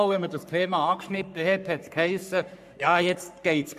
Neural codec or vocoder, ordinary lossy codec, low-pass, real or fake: codec, 44.1 kHz, 3.4 kbps, Pupu-Codec; AAC, 96 kbps; 14.4 kHz; fake